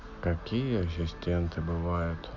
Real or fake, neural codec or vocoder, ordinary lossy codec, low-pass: real; none; none; 7.2 kHz